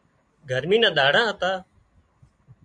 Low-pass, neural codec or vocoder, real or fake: 9.9 kHz; none; real